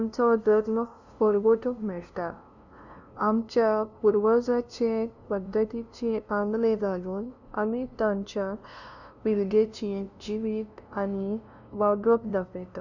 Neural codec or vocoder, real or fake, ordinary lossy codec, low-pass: codec, 16 kHz, 0.5 kbps, FunCodec, trained on LibriTTS, 25 frames a second; fake; none; 7.2 kHz